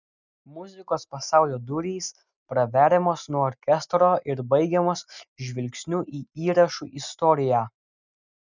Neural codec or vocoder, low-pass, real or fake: none; 7.2 kHz; real